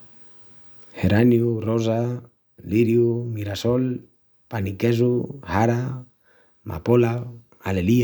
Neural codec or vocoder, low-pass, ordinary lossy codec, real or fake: none; none; none; real